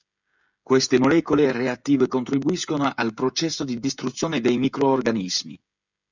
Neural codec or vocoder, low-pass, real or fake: codec, 16 kHz, 16 kbps, FreqCodec, smaller model; 7.2 kHz; fake